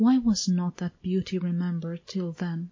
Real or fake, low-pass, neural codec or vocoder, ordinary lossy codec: real; 7.2 kHz; none; MP3, 32 kbps